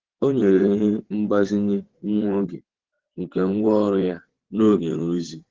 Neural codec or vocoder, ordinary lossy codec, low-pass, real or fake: vocoder, 22.05 kHz, 80 mel bands, WaveNeXt; Opus, 16 kbps; 7.2 kHz; fake